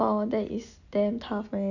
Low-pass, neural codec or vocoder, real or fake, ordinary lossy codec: 7.2 kHz; none; real; none